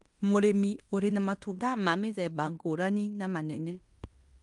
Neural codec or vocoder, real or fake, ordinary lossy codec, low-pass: codec, 16 kHz in and 24 kHz out, 0.9 kbps, LongCat-Audio-Codec, fine tuned four codebook decoder; fake; Opus, 32 kbps; 10.8 kHz